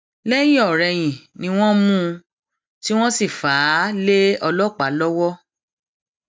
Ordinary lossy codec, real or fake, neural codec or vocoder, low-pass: none; real; none; none